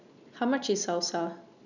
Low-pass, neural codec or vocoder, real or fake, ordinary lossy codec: 7.2 kHz; none; real; none